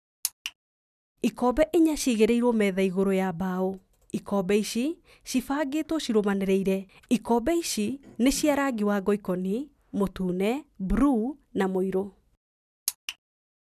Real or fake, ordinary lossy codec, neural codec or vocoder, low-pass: real; none; none; 14.4 kHz